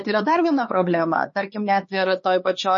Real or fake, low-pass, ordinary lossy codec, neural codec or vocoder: fake; 7.2 kHz; MP3, 32 kbps; codec, 16 kHz, 4 kbps, X-Codec, HuBERT features, trained on LibriSpeech